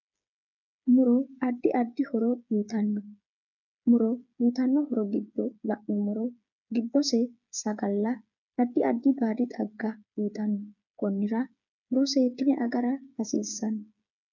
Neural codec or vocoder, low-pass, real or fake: codec, 16 kHz, 8 kbps, FreqCodec, smaller model; 7.2 kHz; fake